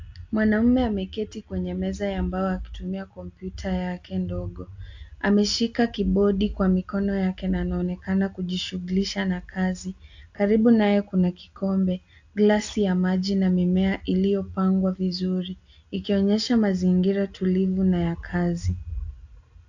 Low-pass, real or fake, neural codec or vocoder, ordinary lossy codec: 7.2 kHz; real; none; AAC, 48 kbps